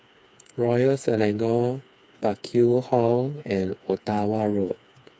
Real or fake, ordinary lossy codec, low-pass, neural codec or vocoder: fake; none; none; codec, 16 kHz, 4 kbps, FreqCodec, smaller model